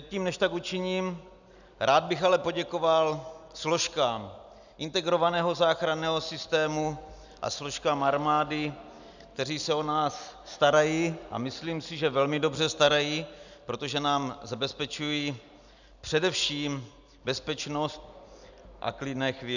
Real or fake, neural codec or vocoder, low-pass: real; none; 7.2 kHz